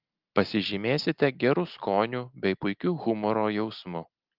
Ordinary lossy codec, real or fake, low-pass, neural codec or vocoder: Opus, 24 kbps; real; 5.4 kHz; none